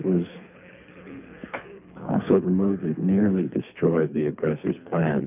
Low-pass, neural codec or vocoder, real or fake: 3.6 kHz; codec, 24 kHz, 3 kbps, HILCodec; fake